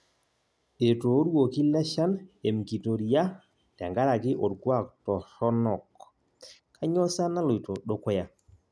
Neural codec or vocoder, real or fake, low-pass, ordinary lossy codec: none; real; none; none